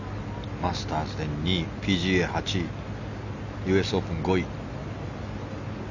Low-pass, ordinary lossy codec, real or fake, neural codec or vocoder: 7.2 kHz; none; real; none